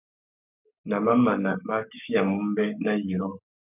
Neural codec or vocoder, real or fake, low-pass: codec, 44.1 kHz, 7.8 kbps, Pupu-Codec; fake; 3.6 kHz